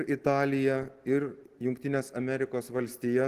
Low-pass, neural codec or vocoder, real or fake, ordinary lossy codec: 14.4 kHz; none; real; Opus, 16 kbps